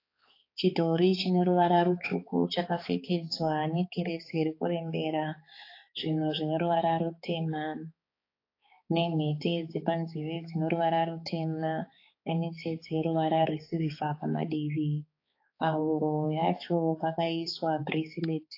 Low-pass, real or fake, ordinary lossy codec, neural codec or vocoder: 5.4 kHz; fake; AAC, 32 kbps; codec, 16 kHz, 4 kbps, X-Codec, HuBERT features, trained on balanced general audio